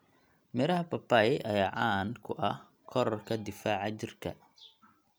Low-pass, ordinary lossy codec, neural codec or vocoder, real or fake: none; none; none; real